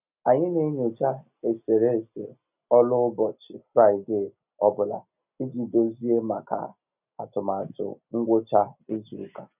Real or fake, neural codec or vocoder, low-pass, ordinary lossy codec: real; none; 3.6 kHz; none